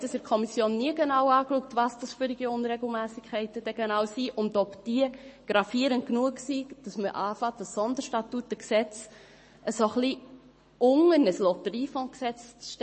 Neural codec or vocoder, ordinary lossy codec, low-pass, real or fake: codec, 44.1 kHz, 7.8 kbps, Pupu-Codec; MP3, 32 kbps; 10.8 kHz; fake